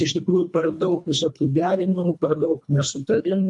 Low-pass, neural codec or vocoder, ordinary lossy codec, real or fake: 10.8 kHz; codec, 24 kHz, 1.5 kbps, HILCodec; MP3, 64 kbps; fake